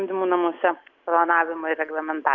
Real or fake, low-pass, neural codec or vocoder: real; 7.2 kHz; none